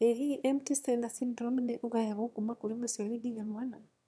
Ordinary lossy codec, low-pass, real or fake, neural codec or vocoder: none; none; fake; autoencoder, 22.05 kHz, a latent of 192 numbers a frame, VITS, trained on one speaker